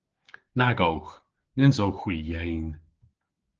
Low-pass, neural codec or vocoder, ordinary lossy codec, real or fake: 7.2 kHz; codec, 16 kHz, 6 kbps, DAC; Opus, 16 kbps; fake